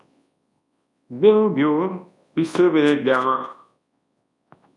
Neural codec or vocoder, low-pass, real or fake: codec, 24 kHz, 0.9 kbps, WavTokenizer, large speech release; 10.8 kHz; fake